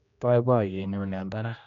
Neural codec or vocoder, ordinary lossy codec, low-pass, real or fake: codec, 16 kHz, 1 kbps, X-Codec, HuBERT features, trained on general audio; none; 7.2 kHz; fake